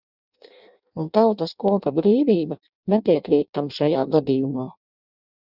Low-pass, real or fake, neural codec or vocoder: 5.4 kHz; fake; codec, 16 kHz in and 24 kHz out, 0.6 kbps, FireRedTTS-2 codec